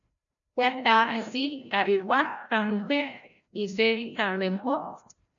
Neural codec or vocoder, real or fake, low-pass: codec, 16 kHz, 0.5 kbps, FreqCodec, larger model; fake; 7.2 kHz